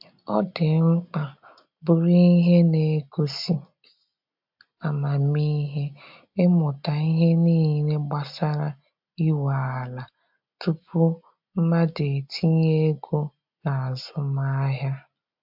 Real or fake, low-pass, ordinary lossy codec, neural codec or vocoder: real; 5.4 kHz; MP3, 48 kbps; none